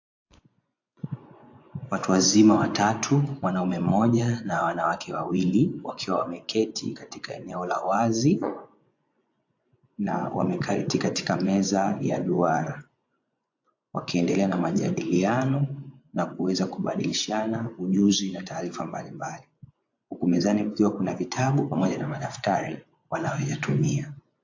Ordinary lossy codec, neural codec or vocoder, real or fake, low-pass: AAC, 48 kbps; vocoder, 24 kHz, 100 mel bands, Vocos; fake; 7.2 kHz